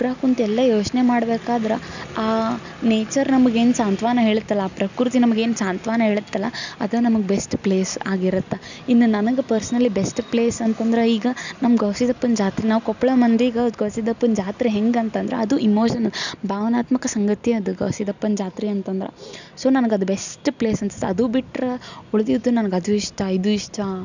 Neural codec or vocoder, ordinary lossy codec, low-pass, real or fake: none; none; 7.2 kHz; real